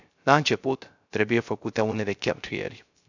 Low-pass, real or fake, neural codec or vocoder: 7.2 kHz; fake; codec, 16 kHz, 0.3 kbps, FocalCodec